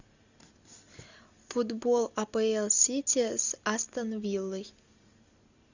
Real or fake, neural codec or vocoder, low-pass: real; none; 7.2 kHz